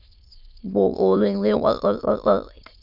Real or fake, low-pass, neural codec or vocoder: fake; 5.4 kHz; autoencoder, 22.05 kHz, a latent of 192 numbers a frame, VITS, trained on many speakers